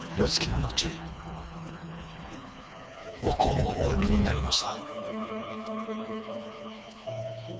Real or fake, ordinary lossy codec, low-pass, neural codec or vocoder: fake; none; none; codec, 16 kHz, 2 kbps, FreqCodec, smaller model